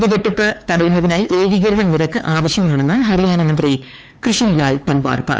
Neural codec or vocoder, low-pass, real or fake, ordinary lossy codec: codec, 16 kHz, 4 kbps, X-Codec, HuBERT features, trained on LibriSpeech; none; fake; none